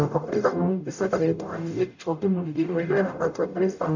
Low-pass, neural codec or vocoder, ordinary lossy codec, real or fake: 7.2 kHz; codec, 44.1 kHz, 0.9 kbps, DAC; none; fake